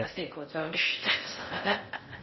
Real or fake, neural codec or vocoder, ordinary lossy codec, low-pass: fake; codec, 16 kHz in and 24 kHz out, 0.6 kbps, FocalCodec, streaming, 4096 codes; MP3, 24 kbps; 7.2 kHz